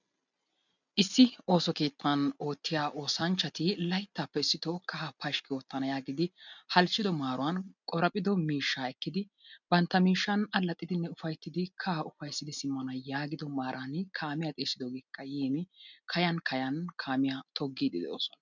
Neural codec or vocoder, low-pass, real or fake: none; 7.2 kHz; real